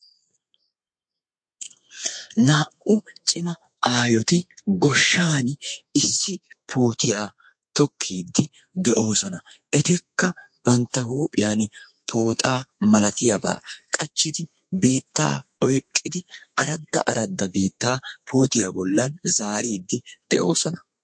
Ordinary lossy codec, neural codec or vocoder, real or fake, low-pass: MP3, 48 kbps; codec, 32 kHz, 1.9 kbps, SNAC; fake; 9.9 kHz